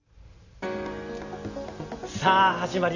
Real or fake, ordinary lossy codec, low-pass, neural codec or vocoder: real; none; 7.2 kHz; none